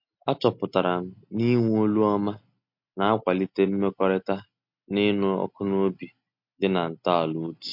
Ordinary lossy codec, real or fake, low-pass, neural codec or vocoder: MP3, 48 kbps; real; 5.4 kHz; none